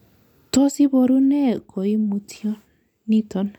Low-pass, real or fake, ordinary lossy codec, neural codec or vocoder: 19.8 kHz; real; none; none